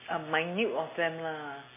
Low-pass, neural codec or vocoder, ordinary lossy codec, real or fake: 3.6 kHz; none; MP3, 16 kbps; real